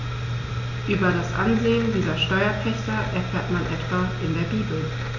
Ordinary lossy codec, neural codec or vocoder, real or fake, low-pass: none; none; real; 7.2 kHz